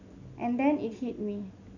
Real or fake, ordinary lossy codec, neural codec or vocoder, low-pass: real; none; none; 7.2 kHz